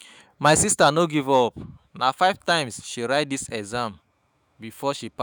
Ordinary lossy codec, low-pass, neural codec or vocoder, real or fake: none; none; autoencoder, 48 kHz, 128 numbers a frame, DAC-VAE, trained on Japanese speech; fake